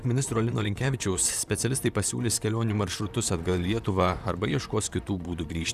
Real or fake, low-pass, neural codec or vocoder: fake; 14.4 kHz; vocoder, 44.1 kHz, 128 mel bands, Pupu-Vocoder